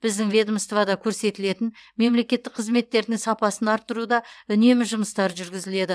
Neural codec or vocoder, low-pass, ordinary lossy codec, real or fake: vocoder, 22.05 kHz, 80 mel bands, WaveNeXt; none; none; fake